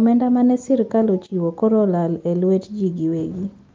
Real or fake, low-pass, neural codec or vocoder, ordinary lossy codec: real; 7.2 kHz; none; Opus, 32 kbps